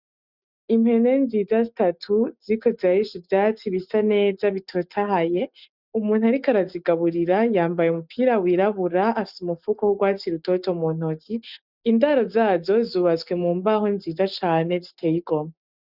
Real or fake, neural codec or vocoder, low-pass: real; none; 5.4 kHz